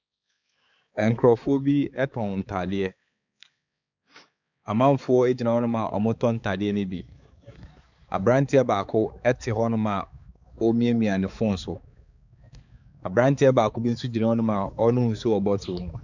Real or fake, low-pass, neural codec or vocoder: fake; 7.2 kHz; codec, 16 kHz, 4 kbps, X-Codec, HuBERT features, trained on balanced general audio